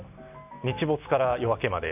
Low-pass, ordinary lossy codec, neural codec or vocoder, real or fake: 3.6 kHz; none; none; real